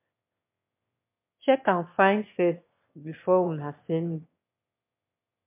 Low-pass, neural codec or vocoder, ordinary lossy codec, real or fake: 3.6 kHz; autoencoder, 22.05 kHz, a latent of 192 numbers a frame, VITS, trained on one speaker; MP3, 32 kbps; fake